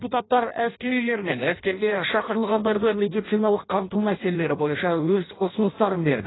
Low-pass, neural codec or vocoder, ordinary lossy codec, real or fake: 7.2 kHz; codec, 16 kHz in and 24 kHz out, 0.6 kbps, FireRedTTS-2 codec; AAC, 16 kbps; fake